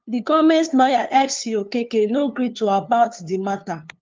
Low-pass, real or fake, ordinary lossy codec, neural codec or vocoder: 7.2 kHz; fake; Opus, 32 kbps; codec, 16 kHz, 2 kbps, FunCodec, trained on LibriTTS, 25 frames a second